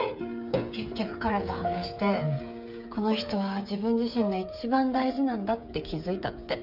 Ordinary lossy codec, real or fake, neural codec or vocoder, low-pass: none; fake; codec, 16 kHz, 8 kbps, FreqCodec, smaller model; 5.4 kHz